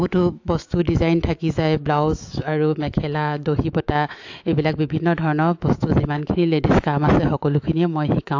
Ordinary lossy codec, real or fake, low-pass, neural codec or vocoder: AAC, 48 kbps; fake; 7.2 kHz; autoencoder, 48 kHz, 128 numbers a frame, DAC-VAE, trained on Japanese speech